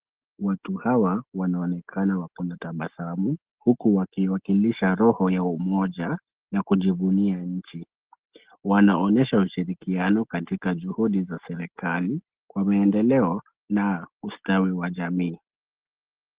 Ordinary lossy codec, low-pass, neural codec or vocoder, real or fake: Opus, 16 kbps; 3.6 kHz; none; real